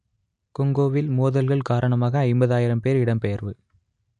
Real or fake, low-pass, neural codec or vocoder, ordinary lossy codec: real; 9.9 kHz; none; none